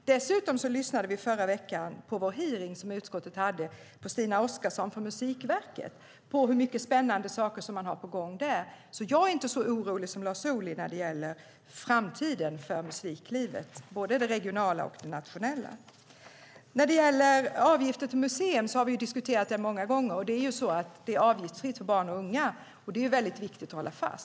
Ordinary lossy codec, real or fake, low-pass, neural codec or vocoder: none; real; none; none